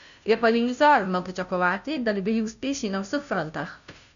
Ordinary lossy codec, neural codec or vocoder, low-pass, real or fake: none; codec, 16 kHz, 0.5 kbps, FunCodec, trained on Chinese and English, 25 frames a second; 7.2 kHz; fake